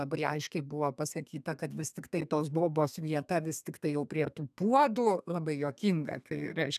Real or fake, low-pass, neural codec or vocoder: fake; 14.4 kHz; codec, 32 kHz, 1.9 kbps, SNAC